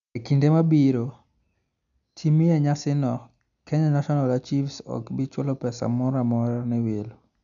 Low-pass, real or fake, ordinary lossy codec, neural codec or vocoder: 7.2 kHz; real; none; none